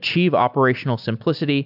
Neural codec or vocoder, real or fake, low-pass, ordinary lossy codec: none; real; 5.4 kHz; AAC, 48 kbps